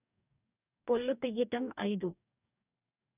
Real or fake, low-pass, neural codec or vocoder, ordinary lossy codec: fake; 3.6 kHz; codec, 44.1 kHz, 2.6 kbps, DAC; none